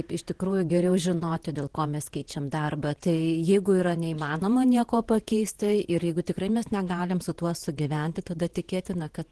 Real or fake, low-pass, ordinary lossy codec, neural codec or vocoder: fake; 10.8 kHz; Opus, 16 kbps; vocoder, 48 kHz, 128 mel bands, Vocos